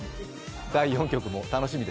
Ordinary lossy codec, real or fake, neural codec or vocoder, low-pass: none; real; none; none